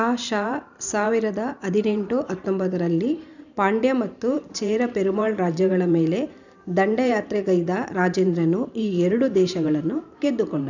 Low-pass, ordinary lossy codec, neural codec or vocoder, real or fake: 7.2 kHz; none; vocoder, 44.1 kHz, 128 mel bands every 512 samples, BigVGAN v2; fake